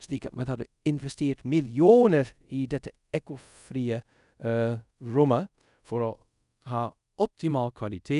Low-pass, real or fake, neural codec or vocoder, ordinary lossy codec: 10.8 kHz; fake; codec, 24 kHz, 0.5 kbps, DualCodec; none